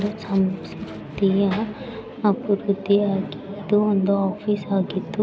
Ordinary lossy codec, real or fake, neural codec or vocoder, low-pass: none; real; none; none